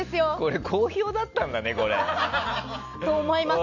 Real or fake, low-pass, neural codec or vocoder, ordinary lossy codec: real; 7.2 kHz; none; none